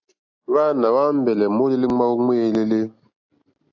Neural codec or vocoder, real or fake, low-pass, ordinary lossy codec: none; real; 7.2 kHz; AAC, 48 kbps